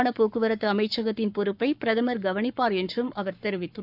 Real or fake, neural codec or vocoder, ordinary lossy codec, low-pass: fake; codec, 44.1 kHz, 7.8 kbps, Pupu-Codec; none; 5.4 kHz